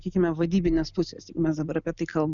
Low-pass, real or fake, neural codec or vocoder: 7.2 kHz; real; none